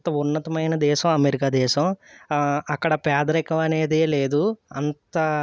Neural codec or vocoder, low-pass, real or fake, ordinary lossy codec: none; none; real; none